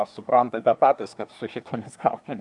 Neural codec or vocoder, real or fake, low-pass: codec, 24 kHz, 1 kbps, SNAC; fake; 10.8 kHz